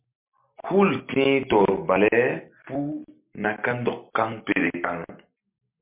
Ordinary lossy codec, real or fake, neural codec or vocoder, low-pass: MP3, 32 kbps; real; none; 3.6 kHz